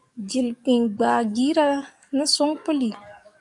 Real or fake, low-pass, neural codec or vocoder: fake; 10.8 kHz; codec, 44.1 kHz, 7.8 kbps, DAC